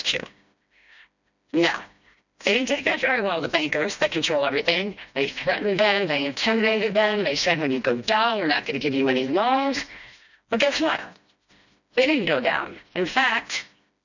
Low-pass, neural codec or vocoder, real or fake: 7.2 kHz; codec, 16 kHz, 1 kbps, FreqCodec, smaller model; fake